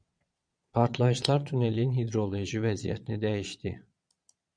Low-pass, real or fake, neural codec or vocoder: 9.9 kHz; fake; vocoder, 22.05 kHz, 80 mel bands, Vocos